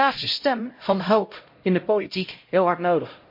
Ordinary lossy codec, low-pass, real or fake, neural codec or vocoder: MP3, 32 kbps; 5.4 kHz; fake; codec, 16 kHz, 0.5 kbps, X-Codec, HuBERT features, trained on LibriSpeech